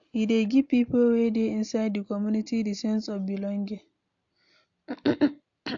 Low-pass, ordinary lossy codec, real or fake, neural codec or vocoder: 7.2 kHz; AAC, 64 kbps; real; none